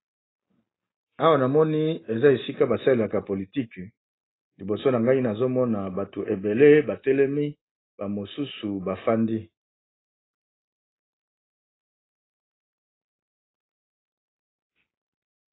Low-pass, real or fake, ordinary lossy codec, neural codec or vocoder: 7.2 kHz; real; AAC, 16 kbps; none